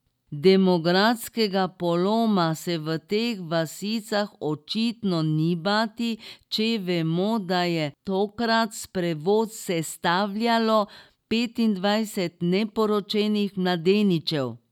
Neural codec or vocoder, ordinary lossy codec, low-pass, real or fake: none; none; 19.8 kHz; real